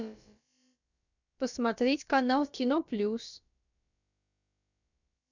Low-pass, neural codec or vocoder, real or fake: 7.2 kHz; codec, 16 kHz, about 1 kbps, DyCAST, with the encoder's durations; fake